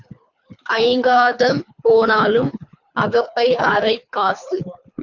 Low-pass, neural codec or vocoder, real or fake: 7.2 kHz; codec, 24 kHz, 3 kbps, HILCodec; fake